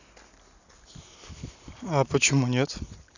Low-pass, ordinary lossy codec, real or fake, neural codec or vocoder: 7.2 kHz; none; real; none